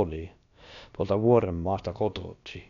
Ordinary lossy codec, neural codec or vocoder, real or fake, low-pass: none; codec, 16 kHz, about 1 kbps, DyCAST, with the encoder's durations; fake; 7.2 kHz